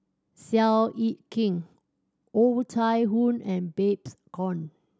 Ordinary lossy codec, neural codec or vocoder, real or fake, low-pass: none; none; real; none